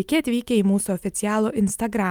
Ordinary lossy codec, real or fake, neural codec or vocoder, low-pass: Opus, 32 kbps; real; none; 19.8 kHz